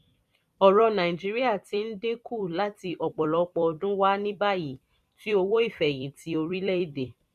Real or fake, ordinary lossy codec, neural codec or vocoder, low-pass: fake; none; vocoder, 44.1 kHz, 128 mel bands every 256 samples, BigVGAN v2; 14.4 kHz